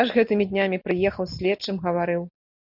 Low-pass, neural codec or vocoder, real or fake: 5.4 kHz; none; real